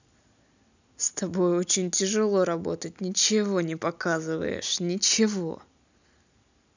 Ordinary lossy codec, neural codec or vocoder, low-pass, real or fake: none; none; 7.2 kHz; real